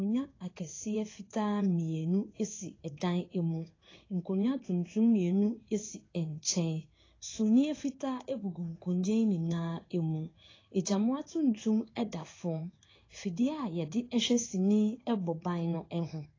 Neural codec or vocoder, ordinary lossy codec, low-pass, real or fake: codec, 16 kHz in and 24 kHz out, 1 kbps, XY-Tokenizer; AAC, 32 kbps; 7.2 kHz; fake